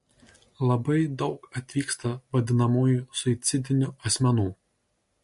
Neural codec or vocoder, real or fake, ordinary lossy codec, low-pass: none; real; MP3, 48 kbps; 14.4 kHz